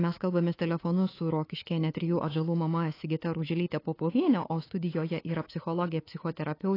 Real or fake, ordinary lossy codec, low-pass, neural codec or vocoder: fake; AAC, 24 kbps; 5.4 kHz; codec, 24 kHz, 3.1 kbps, DualCodec